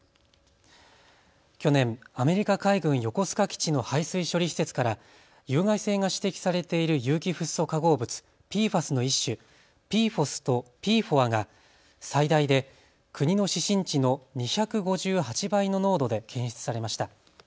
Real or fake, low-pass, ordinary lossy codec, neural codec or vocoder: real; none; none; none